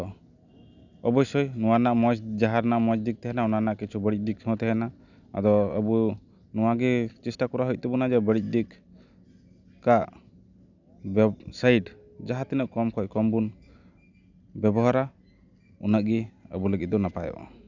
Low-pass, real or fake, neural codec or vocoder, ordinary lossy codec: 7.2 kHz; real; none; none